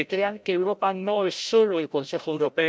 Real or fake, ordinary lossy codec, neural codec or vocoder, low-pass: fake; none; codec, 16 kHz, 0.5 kbps, FreqCodec, larger model; none